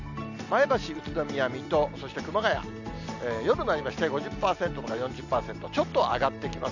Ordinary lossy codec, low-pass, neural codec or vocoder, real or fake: none; 7.2 kHz; none; real